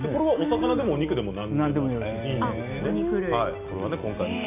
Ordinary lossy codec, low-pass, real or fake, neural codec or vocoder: none; 3.6 kHz; real; none